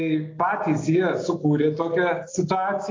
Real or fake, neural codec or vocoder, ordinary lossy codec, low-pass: real; none; AAC, 32 kbps; 7.2 kHz